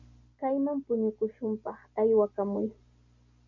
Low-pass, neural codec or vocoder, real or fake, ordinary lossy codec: 7.2 kHz; none; real; MP3, 64 kbps